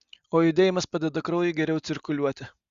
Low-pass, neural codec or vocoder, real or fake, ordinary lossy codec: 7.2 kHz; none; real; Opus, 64 kbps